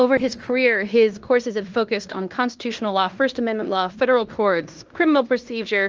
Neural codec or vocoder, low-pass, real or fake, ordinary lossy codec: codec, 16 kHz in and 24 kHz out, 0.9 kbps, LongCat-Audio-Codec, fine tuned four codebook decoder; 7.2 kHz; fake; Opus, 24 kbps